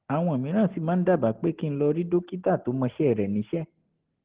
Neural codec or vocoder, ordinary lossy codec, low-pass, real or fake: none; Opus, 16 kbps; 3.6 kHz; real